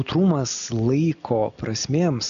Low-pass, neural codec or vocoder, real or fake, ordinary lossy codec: 7.2 kHz; none; real; AAC, 96 kbps